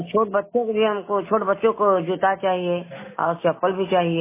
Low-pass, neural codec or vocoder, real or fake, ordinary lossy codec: 3.6 kHz; none; real; MP3, 16 kbps